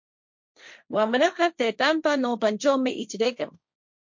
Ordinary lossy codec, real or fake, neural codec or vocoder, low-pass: MP3, 48 kbps; fake; codec, 16 kHz, 1.1 kbps, Voila-Tokenizer; 7.2 kHz